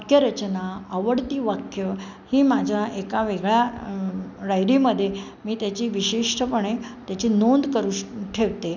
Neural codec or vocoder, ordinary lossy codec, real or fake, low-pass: none; none; real; 7.2 kHz